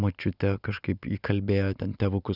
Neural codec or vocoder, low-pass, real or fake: none; 5.4 kHz; real